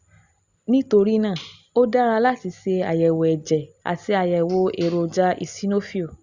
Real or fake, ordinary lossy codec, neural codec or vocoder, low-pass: real; Opus, 64 kbps; none; 7.2 kHz